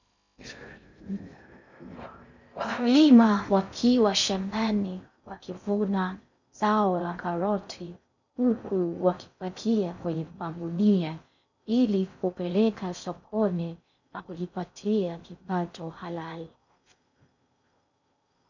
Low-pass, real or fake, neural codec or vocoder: 7.2 kHz; fake; codec, 16 kHz in and 24 kHz out, 0.6 kbps, FocalCodec, streaming, 2048 codes